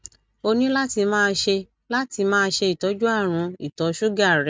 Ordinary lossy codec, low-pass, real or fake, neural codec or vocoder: none; none; real; none